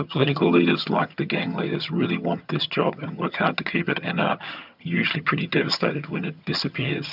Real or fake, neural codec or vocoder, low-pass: fake; vocoder, 22.05 kHz, 80 mel bands, HiFi-GAN; 5.4 kHz